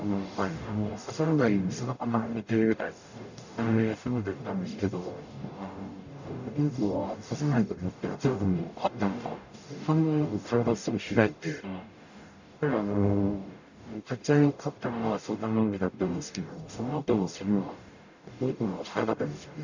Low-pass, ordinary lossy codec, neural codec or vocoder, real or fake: 7.2 kHz; none; codec, 44.1 kHz, 0.9 kbps, DAC; fake